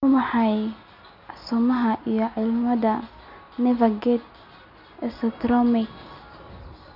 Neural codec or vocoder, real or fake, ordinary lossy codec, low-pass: none; real; none; 5.4 kHz